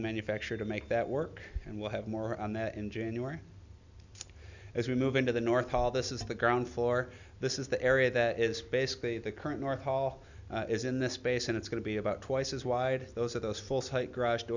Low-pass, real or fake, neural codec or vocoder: 7.2 kHz; real; none